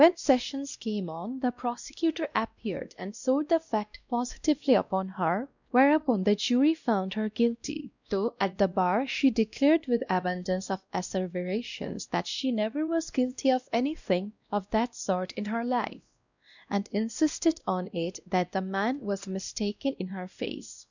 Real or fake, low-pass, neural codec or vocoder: fake; 7.2 kHz; codec, 16 kHz, 1 kbps, X-Codec, WavLM features, trained on Multilingual LibriSpeech